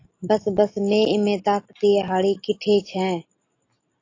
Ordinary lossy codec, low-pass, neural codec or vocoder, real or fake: AAC, 32 kbps; 7.2 kHz; none; real